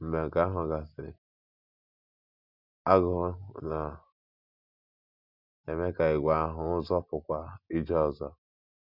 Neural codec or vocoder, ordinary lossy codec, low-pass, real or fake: none; none; 5.4 kHz; real